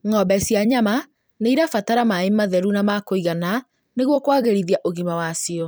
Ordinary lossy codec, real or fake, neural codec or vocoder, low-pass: none; fake; vocoder, 44.1 kHz, 128 mel bands every 256 samples, BigVGAN v2; none